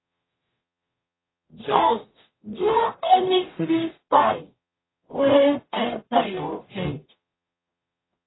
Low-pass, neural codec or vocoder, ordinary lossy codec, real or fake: 7.2 kHz; codec, 44.1 kHz, 0.9 kbps, DAC; AAC, 16 kbps; fake